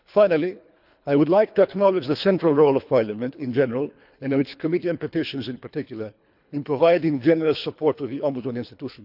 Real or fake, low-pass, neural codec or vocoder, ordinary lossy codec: fake; 5.4 kHz; codec, 24 kHz, 3 kbps, HILCodec; none